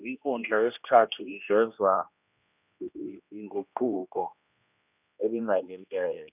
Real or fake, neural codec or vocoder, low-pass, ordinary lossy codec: fake; codec, 16 kHz, 1 kbps, X-Codec, HuBERT features, trained on general audio; 3.6 kHz; none